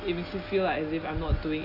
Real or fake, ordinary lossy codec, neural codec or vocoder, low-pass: real; AAC, 32 kbps; none; 5.4 kHz